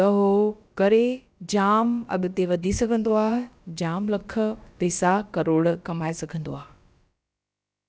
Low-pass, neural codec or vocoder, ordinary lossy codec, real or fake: none; codec, 16 kHz, about 1 kbps, DyCAST, with the encoder's durations; none; fake